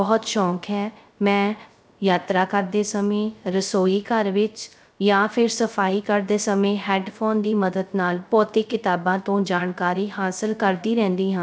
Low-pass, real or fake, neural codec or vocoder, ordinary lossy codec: none; fake; codec, 16 kHz, 0.3 kbps, FocalCodec; none